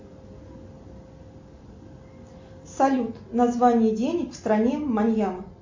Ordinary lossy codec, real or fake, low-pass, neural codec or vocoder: MP3, 48 kbps; real; 7.2 kHz; none